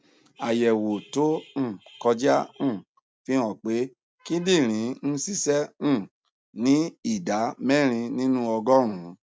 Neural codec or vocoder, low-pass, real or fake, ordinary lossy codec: none; none; real; none